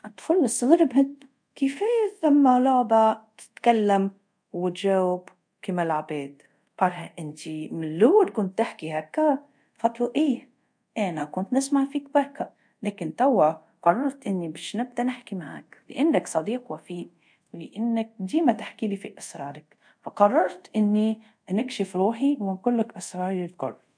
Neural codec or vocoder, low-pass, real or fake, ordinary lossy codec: codec, 24 kHz, 0.5 kbps, DualCodec; 9.9 kHz; fake; MP3, 64 kbps